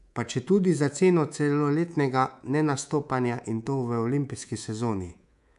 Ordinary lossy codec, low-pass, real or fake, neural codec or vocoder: none; 10.8 kHz; fake; codec, 24 kHz, 3.1 kbps, DualCodec